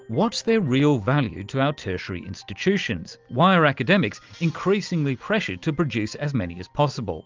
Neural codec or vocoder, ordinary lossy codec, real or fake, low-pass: none; Opus, 24 kbps; real; 7.2 kHz